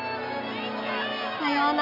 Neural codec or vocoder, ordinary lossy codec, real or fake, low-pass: none; none; real; 5.4 kHz